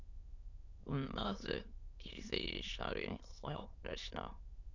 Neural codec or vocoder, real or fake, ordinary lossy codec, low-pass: autoencoder, 22.05 kHz, a latent of 192 numbers a frame, VITS, trained on many speakers; fake; Opus, 64 kbps; 7.2 kHz